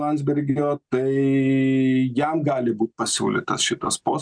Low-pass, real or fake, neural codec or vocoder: 9.9 kHz; real; none